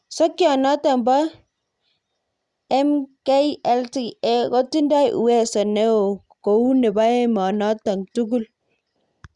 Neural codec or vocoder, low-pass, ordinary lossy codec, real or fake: none; 10.8 kHz; Opus, 64 kbps; real